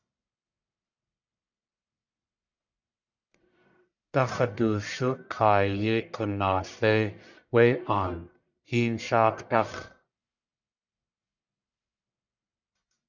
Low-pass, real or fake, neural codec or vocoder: 7.2 kHz; fake; codec, 44.1 kHz, 1.7 kbps, Pupu-Codec